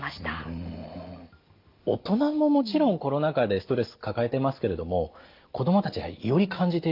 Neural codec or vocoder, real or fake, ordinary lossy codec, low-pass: none; real; Opus, 24 kbps; 5.4 kHz